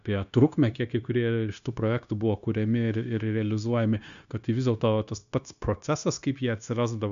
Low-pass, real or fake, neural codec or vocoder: 7.2 kHz; fake; codec, 16 kHz, 0.9 kbps, LongCat-Audio-Codec